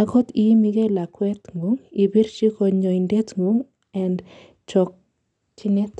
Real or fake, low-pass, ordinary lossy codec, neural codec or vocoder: real; 10.8 kHz; none; none